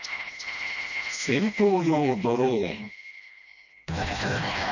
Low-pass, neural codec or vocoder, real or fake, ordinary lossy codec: 7.2 kHz; codec, 16 kHz, 1 kbps, FreqCodec, smaller model; fake; none